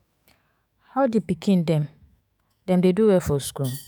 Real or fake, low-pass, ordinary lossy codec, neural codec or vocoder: fake; none; none; autoencoder, 48 kHz, 128 numbers a frame, DAC-VAE, trained on Japanese speech